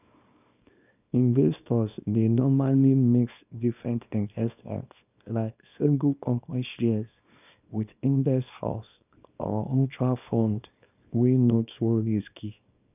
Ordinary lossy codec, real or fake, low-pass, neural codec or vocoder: none; fake; 3.6 kHz; codec, 24 kHz, 0.9 kbps, WavTokenizer, small release